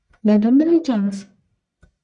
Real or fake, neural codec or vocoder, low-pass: fake; codec, 44.1 kHz, 1.7 kbps, Pupu-Codec; 10.8 kHz